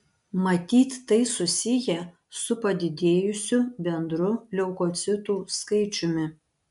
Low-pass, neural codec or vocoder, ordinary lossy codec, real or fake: 10.8 kHz; none; MP3, 96 kbps; real